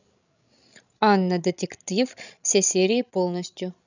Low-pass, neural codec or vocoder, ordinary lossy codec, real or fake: 7.2 kHz; codec, 16 kHz, 16 kbps, FreqCodec, larger model; none; fake